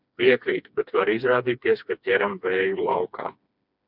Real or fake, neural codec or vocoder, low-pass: fake; codec, 16 kHz, 2 kbps, FreqCodec, smaller model; 5.4 kHz